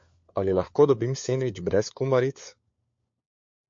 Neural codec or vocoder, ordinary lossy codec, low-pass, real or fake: codec, 16 kHz, 4 kbps, FunCodec, trained on LibriTTS, 50 frames a second; MP3, 48 kbps; 7.2 kHz; fake